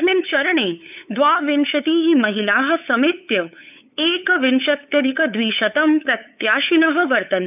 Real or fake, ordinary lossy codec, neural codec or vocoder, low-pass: fake; none; codec, 16 kHz, 8 kbps, FunCodec, trained on LibriTTS, 25 frames a second; 3.6 kHz